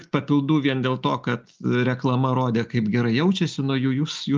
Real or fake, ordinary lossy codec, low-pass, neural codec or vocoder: real; Opus, 24 kbps; 7.2 kHz; none